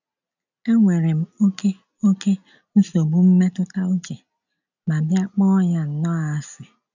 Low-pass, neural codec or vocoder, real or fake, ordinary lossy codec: 7.2 kHz; none; real; none